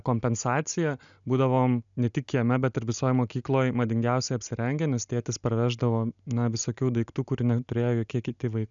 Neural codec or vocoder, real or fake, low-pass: none; real; 7.2 kHz